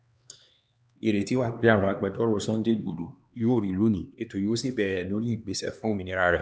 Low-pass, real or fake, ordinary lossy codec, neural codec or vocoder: none; fake; none; codec, 16 kHz, 2 kbps, X-Codec, HuBERT features, trained on LibriSpeech